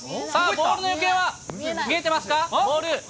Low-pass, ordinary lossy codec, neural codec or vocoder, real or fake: none; none; none; real